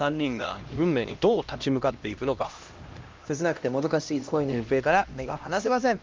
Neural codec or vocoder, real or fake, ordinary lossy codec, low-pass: codec, 16 kHz, 1 kbps, X-Codec, HuBERT features, trained on LibriSpeech; fake; Opus, 24 kbps; 7.2 kHz